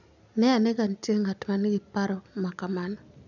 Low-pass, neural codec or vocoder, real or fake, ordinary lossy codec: 7.2 kHz; none; real; none